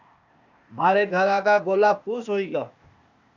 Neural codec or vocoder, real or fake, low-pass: codec, 16 kHz, 0.8 kbps, ZipCodec; fake; 7.2 kHz